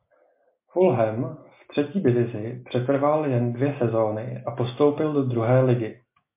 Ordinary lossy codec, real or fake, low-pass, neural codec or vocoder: MP3, 24 kbps; real; 3.6 kHz; none